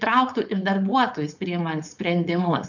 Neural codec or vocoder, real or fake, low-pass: codec, 16 kHz, 4.8 kbps, FACodec; fake; 7.2 kHz